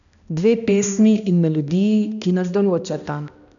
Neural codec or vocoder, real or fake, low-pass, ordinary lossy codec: codec, 16 kHz, 1 kbps, X-Codec, HuBERT features, trained on balanced general audio; fake; 7.2 kHz; none